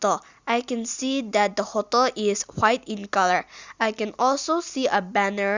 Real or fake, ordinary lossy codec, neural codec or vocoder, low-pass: real; Opus, 64 kbps; none; 7.2 kHz